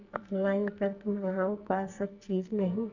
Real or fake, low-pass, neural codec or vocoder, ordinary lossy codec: fake; 7.2 kHz; codec, 44.1 kHz, 2.6 kbps, SNAC; none